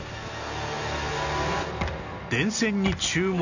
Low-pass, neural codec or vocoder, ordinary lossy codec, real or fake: 7.2 kHz; none; none; real